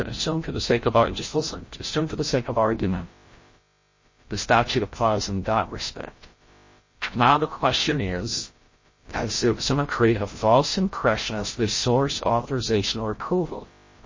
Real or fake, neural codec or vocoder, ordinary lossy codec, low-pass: fake; codec, 16 kHz, 0.5 kbps, FreqCodec, larger model; MP3, 32 kbps; 7.2 kHz